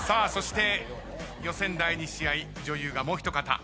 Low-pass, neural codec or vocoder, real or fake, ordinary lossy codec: none; none; real; none